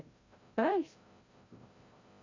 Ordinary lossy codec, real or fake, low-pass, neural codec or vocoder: AAC, 32 kbps; fake; 7.2 kHz; codec, 16 kHz, 0.5 kbps, FreqCodec, larger model